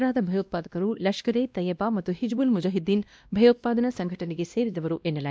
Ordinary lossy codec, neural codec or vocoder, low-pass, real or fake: none; codec, 16 kHz, 1 kbps, X-Codec, WavLM features, trained on Multilingual LibriSpeech; none; fake